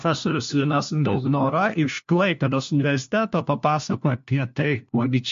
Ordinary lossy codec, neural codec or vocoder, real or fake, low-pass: MP3, 48 kbps; codec, 16 kHz, 1 kbps, FunCodec, trained on LibriTTS, 50 frames a second; fake; 7.2 kHz